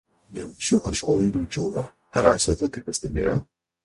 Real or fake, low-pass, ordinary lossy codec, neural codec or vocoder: fake; 14.4 kHz; MP3, 48 kbps; codec, 44.1 kHz, 0.9 kbps, DAC